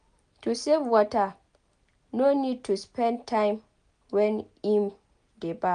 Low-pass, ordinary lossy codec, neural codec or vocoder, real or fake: 9.9 kHz; none; none; real